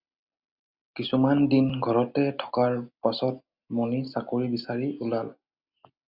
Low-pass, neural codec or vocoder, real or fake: 5.4 kHz; none; real